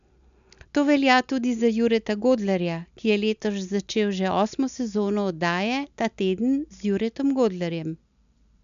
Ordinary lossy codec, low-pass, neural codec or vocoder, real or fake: AAC, 96 kbps; 7.2 kHz; none; real